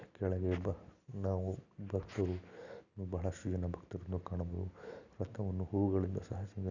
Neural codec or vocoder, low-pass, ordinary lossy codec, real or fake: none; 7.2 kHz; none; real